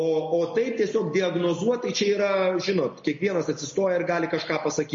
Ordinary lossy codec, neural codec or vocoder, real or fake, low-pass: MP3, 32 kbps; none; real; 7.2 kHz